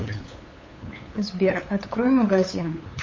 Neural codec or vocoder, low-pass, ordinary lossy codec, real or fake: codec, 16 kHz, 8 kbps, FunCodec, trained on LibriTTS, 25 frames a second; 7.2 kHz; MP3, 32 kbps; fake